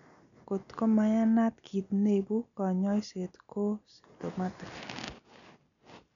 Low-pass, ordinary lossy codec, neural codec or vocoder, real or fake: 7.2 kHz; none; none; real